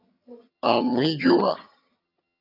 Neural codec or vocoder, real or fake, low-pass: vocoder, 22.05 kHz, 80 mel bands, HiFi-GAN; fake; 5.4 kHz